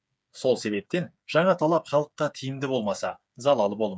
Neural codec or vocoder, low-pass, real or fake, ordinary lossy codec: codec, 16 kHz, 8 kbps, FreqCodec, smaller model; none; fake; none